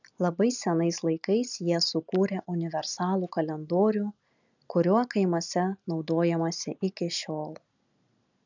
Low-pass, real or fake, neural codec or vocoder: 7.2 kHz; real; none